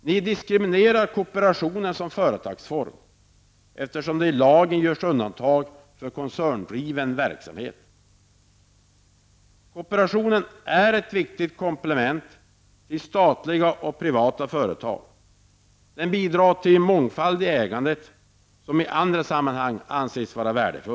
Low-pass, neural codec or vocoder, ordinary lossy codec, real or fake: none; none; none; real